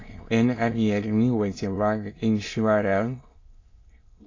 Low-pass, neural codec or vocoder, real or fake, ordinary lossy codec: 7.2 kHz; autoencoder, 22.05 kHz, a latent of 192 numbers a frame, VITS, trained on many speakers; fake; AAC, 32 kbps